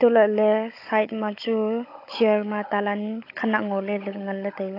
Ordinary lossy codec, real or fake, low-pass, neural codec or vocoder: AAC, 32 kbps; fake; 5.4 kHz; codec, 16 kHz, 16 kbps, FunCodec, trained on Chinese and English, 50 frames a second